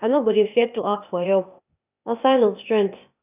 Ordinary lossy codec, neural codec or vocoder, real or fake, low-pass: none; codec, 16 kHz, 0.8 kbps, ZipCodec; fake; 3.6 kHz